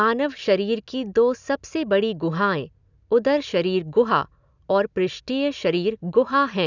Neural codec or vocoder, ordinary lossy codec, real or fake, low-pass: none; none; real; 7.2 kHz